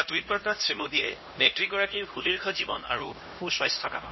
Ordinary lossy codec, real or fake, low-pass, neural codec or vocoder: MP3, 24 kbps; fake; 7.2 kHz; codec, 16 kHz, 1 kbps, X-Codec, HuBERT features, trained on LibriSpeech